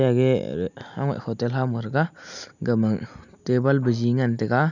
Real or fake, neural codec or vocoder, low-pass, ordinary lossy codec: real; none; 7.2 kHz; none